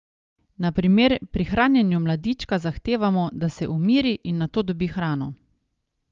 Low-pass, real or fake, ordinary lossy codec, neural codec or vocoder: 7.2 kHz; real; Opus, 32 kbps; none